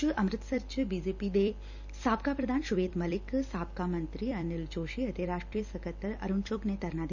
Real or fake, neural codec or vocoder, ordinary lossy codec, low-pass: fake; vocoder, 44.1 kHz, 128 mel bands every 256 samples, BigVGAN v2; none; 7.2 kHz